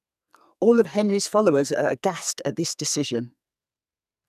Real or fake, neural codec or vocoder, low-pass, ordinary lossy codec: fake; codec, 44.1 kHz, 2.6 kbps, SNAC; 14.4 kHz; none